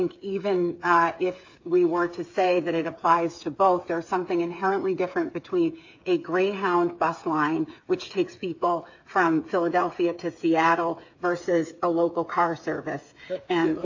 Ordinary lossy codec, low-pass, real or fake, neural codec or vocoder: AAC, 48 kbps; 7.2 kHz; fake; codec, 16 kHz, 8 kbps, FreqCodec, smaller model